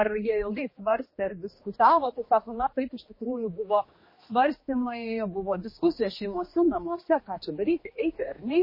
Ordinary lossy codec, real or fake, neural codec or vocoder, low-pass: MP3, 24 kbps; fake; codec, 16 kHz, 2 kbps, X-Codec, HuBERT features, trained on general audio; 5.4 kHz